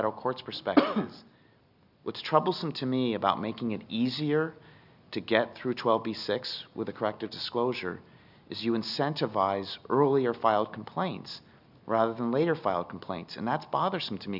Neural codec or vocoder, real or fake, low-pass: none; real; 5.4 kHz